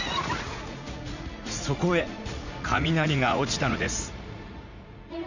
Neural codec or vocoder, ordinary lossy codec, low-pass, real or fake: vocoder, 44.1 kHz, 80 mel bands, Vocos; none; 7.2 kHz; fake